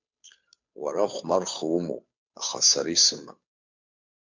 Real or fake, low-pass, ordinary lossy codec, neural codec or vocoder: fake; 7.2 kHz; MP3, 64 kbps; codec, 16 kHz, 2 kbps, FunCodec, trained on Chinese and English, 25 frames a second